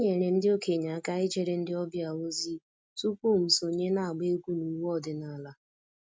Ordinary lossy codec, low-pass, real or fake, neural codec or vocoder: none; none; real; none